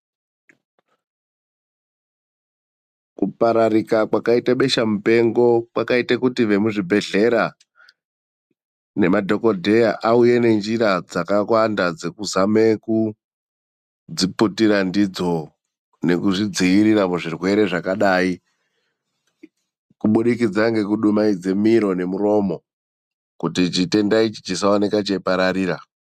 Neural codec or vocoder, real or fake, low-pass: none; real; 14.4 kHz